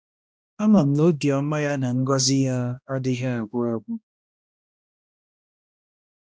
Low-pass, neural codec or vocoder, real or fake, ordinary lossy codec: none; codec, 16 kHz, 1 kbps, X-Codec, HuBERT features, trained on balanced general audio; fake; none